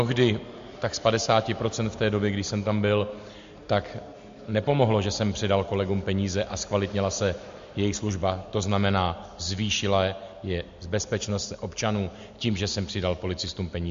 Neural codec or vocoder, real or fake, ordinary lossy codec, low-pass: none; real; MP3, 48 kbps; 7.2 kHz